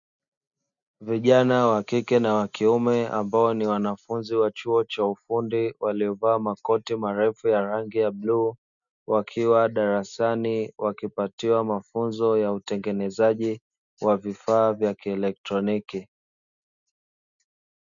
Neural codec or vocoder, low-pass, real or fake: none; 7.2 kHz; real